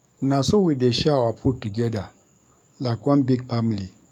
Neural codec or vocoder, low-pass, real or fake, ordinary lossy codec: codec, 44.1 kHz, 7.8 kbps, DAC; 19.8 kHz; fake; none